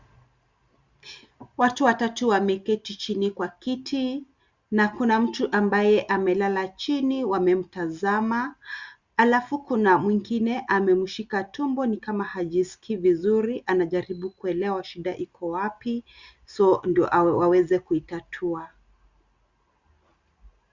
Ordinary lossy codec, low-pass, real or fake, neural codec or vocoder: Opus, 64 kbps; 7.2 kHz; real; none